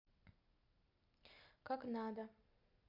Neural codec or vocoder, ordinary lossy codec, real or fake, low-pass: none; MP3, 48 kbps; real; 5.4 kHz